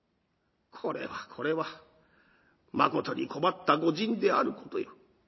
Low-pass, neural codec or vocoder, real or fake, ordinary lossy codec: 7.2 kHz; none; real; MP3, 24 kbps